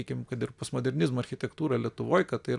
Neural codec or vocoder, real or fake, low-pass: none; real; 10.8 kHz